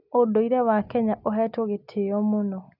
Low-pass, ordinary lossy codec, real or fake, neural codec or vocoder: 5.4 kHz; none; real; none